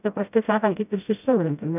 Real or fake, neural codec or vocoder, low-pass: fake; codec, 16 kHz, 0.5 kbps, FreqCodec, smaller model; 3.6 kHz